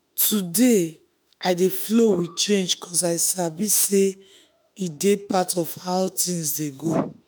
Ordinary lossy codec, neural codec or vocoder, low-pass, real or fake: none; autoencoder, 48 kHz, 32 numbers a frame, DAC-VAE, trained on Japanese speech; none; fake